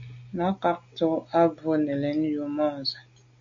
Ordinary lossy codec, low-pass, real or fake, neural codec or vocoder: MP3, 48 kbps; 7.2 kHz; real; none